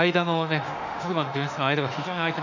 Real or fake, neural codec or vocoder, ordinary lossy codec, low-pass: fake; autoencoder, 48 kHz, 32 numbers a frame, DAC-VAE, trained on Japanese speech; none; 7.2 kHz